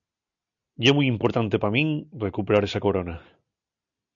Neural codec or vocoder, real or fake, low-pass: none; real; 7.2 kHz